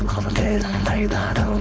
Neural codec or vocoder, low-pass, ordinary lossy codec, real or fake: codec, 16 kHz, 4.8 kbps, FACodec; none; none; fake